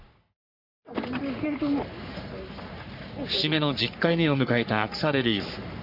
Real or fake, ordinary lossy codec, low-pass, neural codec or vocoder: fake; none; 5.4 kHz; codec, 44.1 kHz, 3.4 kbps, Pupu-Codec